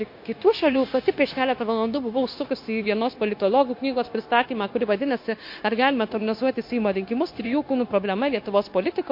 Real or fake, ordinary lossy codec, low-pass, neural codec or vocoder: fake; MP3, 32 kbps; 5.4 kHz; codec, 16 kHz, 0.9 kbps, LongCat-Audio-Codec